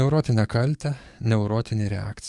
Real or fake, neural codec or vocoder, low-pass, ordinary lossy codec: fake; codec, 44.1 kHz, 7.8 kbps, DAC; 10.8 kHz; Opus, 64 kbps